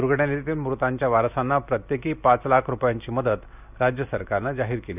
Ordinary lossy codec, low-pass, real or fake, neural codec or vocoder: none; 3.6 kHz; real; none